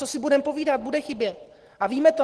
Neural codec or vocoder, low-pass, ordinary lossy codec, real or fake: none; 10.8 kHz; Opus, 16 kbps; real